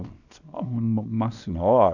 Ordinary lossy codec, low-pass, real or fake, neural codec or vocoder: none; 7.2 kHz; fake; codec, 24 kHz, 0.9 kbps, WavTokenizer, small release